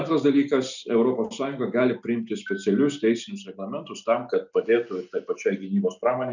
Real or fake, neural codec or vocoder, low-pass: real; none; 7.2 kHz